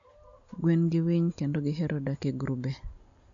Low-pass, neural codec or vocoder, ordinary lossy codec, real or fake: 7.2 kHz; none; MP3, 64 kbps; real